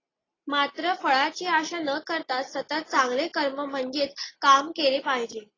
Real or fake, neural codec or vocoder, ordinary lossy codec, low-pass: real; none; AAC, 32 kbps; 7.2 kHz